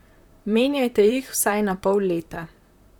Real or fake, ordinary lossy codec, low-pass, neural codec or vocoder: fake; none; 19.8 kHz; vocoder, 44.1 kHz, 128 mel bands, Pupu-Vocoder